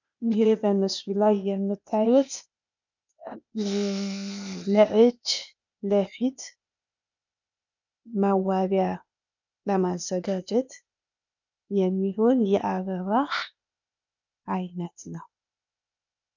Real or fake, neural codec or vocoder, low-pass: fake; codec, 16 kHz, 0.8 kbps, ZipCodec; 7.2 kHz